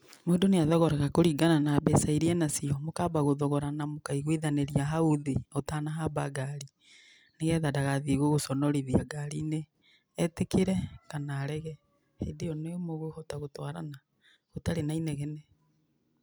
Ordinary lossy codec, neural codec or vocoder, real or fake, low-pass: none; none; real; none